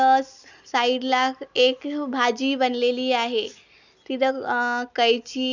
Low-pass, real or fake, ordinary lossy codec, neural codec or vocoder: 7.2 kHz; real; none; none